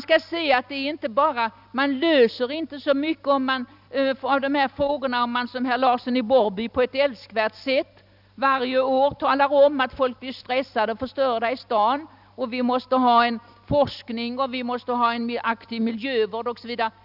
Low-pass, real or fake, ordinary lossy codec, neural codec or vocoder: 5.4 kHz; real; none; none